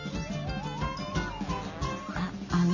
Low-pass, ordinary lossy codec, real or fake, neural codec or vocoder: 7.2 kHz; none; real; none